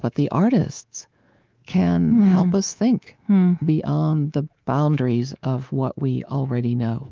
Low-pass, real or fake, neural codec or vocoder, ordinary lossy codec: 7.2 kHz; fake; codec, 24 kHz, 3.1 kbps, DualCodec; Opus, 24 kbps